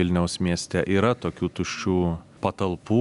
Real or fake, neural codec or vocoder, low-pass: real; none; 10.8 kHz